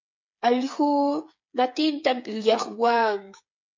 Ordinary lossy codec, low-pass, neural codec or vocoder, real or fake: MP3, 48 kbps; 7.2 kHz; codec, 16 kHz, 8 kbps, FreqCodec, smaller model; fake